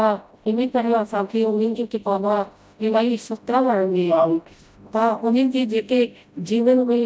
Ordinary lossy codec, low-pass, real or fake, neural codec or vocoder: none; none; fake; codec, 16 kHz, 0.5 kbps, FreqCodec, smaller model